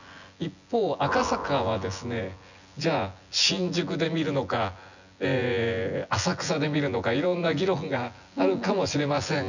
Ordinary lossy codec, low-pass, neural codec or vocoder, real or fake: none; 7.2 kHz; vocoder, 24 kHz, 100 mel bands, Vocos; fake